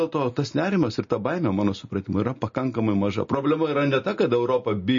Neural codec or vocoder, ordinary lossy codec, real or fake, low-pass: none; MP3, 32 kbps; real; 7.2 kHz